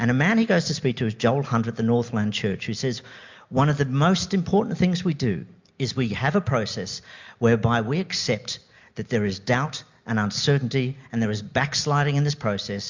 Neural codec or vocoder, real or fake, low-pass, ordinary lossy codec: none; real; 7.2 kHz; MP3, 64 kbps